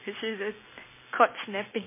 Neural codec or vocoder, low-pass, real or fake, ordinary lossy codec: none; 3.6 kHz; real; MP3, 16 kbps